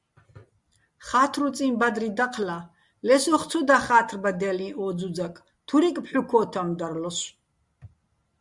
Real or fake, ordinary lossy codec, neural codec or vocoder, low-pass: real; Opus, 64 kbps; none; 10.8 kHz